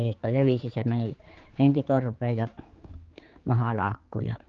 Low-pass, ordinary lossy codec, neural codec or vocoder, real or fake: 7.2 kHz; Opus, 32 kbps; codec, 16 kHz, 4 kbps, X-Codec, HuBERT features, trained on general audio; fake